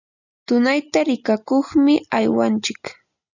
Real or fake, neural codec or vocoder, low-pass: real; none; 7.2 kHz